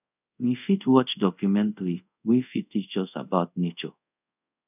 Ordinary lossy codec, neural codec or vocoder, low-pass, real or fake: none; codec, 24 kHz, 0.5 kbps, DualCodec; 3.6 kHz; fake